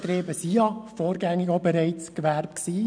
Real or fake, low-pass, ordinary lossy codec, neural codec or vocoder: real; 9.9 kHz; none; none